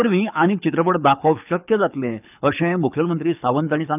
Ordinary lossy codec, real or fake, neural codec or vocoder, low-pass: none; fake; codec, 24 kHz, 6 kbps, HILCodec; 3.6 kHz